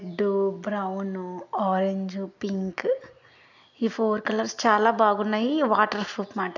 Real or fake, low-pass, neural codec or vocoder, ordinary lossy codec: real; 7.2 kHz; none; none